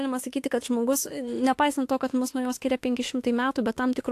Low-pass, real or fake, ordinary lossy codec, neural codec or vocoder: 14.4 kHz; fake; AAC, 64 kbps; autoencoder, 48 kHz, 32 numbers a frame, DAC-VAE, trained on Japanese speech